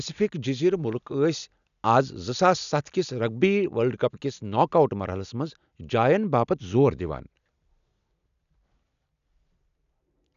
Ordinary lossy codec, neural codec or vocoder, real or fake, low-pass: none; none; real; 7.2 kHz